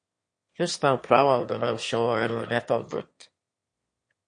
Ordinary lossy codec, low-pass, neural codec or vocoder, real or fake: MP3, 48 kbps; 9.9 kHz; autoencoder, 22.05 kHz, a latent of 192 numbers a frame, VITS, trained on one speaker; fake